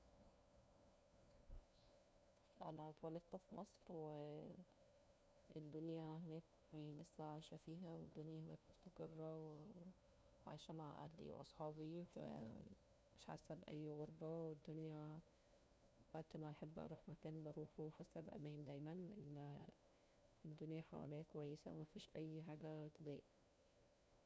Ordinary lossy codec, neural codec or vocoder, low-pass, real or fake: none; codec, 16 kHz, 1 kbps, FunCodec, trained on LibriTTS, 50 frames a second; none; fake